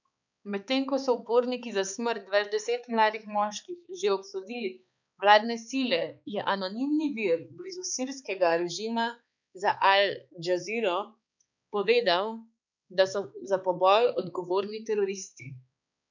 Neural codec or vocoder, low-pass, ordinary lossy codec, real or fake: codec, 16 kHz, 4 kbps, X-Codec, HuBERT features, trained on balanced general audio; 7.2 kHz; none; fake